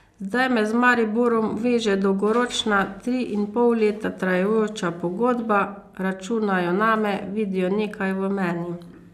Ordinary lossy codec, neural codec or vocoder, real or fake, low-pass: AAC, 96 kbps; none; real; 14.4 kHz